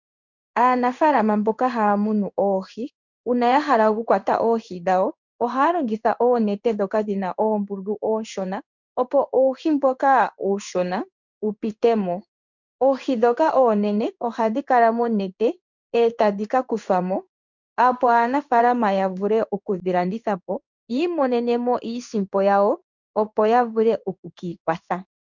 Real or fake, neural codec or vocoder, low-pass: fake; codec, 16 kHz in and 24 kHz out, 1 kbps, XY-Tokenizer; 7.2 kHz